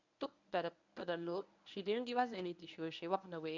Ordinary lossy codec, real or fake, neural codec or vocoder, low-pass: none; fake; codec, 24 kHz, 0.9 kbps, WavTokenizer, medium speech release version 1; 7.2 kHz